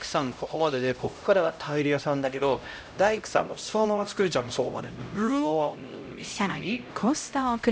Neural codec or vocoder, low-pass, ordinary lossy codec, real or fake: codec, 16 kHz, 0.5 kbps, X-Codec, HuBERT features, trained on LibriSpeech; none; none; fake